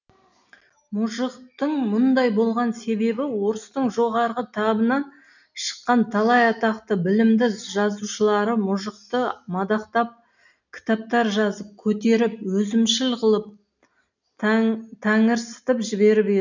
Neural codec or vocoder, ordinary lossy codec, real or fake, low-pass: none; none; real; 7.2 kHz